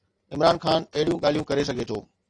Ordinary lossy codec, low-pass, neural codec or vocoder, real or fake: AAC, 48 kbps; 9.9 kHz; none; real